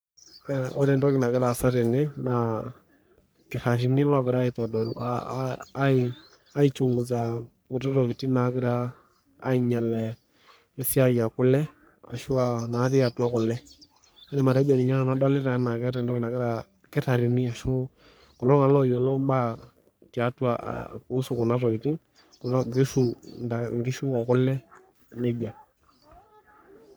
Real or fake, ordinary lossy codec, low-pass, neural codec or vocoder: fake; none; none; codec, 44.1 kHz, 3.4 kbps, Pupu-Codec